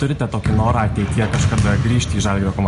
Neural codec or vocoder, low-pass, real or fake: none; 10.8 kHz; real